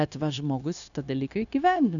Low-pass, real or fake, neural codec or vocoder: 7.2 kHz; fake; codec, 16 kHz, 0.9 kbps, LongCat-Audio-Codec